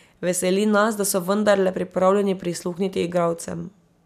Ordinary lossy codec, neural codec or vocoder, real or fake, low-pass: none; none; real; 14.4 kHz